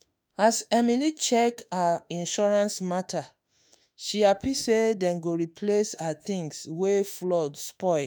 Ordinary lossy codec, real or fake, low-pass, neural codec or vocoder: none; fake; none; autoencoder, 48 kHz, 32 numbers a frame, DAC-VAE, trained on Japanese speech